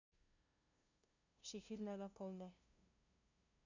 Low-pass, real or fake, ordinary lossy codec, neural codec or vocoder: 7.2 kHz; fake; none; codec, 16 kHz, 0.5 kbps, FunCodec, trained on LibriTTS, 25 frames a second